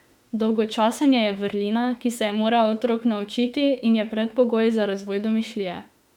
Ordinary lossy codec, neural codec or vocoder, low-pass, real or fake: none; autoencoder, 48 kHz, 32 numbers a frame, DAC-VAE, trained on Japanese speech; 19.8 kHz; fake